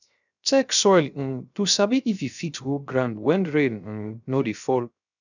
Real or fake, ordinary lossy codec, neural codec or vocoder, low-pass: fake; none; codec, 16 kHz, 0.3 kbps, FocalCodec; 7.2 kHz